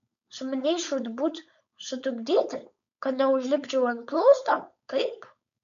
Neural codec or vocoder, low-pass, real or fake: codec, 16 kHz, 4.8 kbps, FACodec; 7.2 kHz; fake